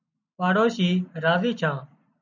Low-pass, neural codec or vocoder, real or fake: 7.2 kHz; none; real